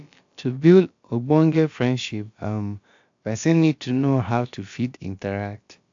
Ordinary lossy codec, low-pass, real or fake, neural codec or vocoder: AAC, 64 kbps; 7.2 kHz; fake; codec, 16 kHz, about 1 kbps, DyCAST, with the encoder's durations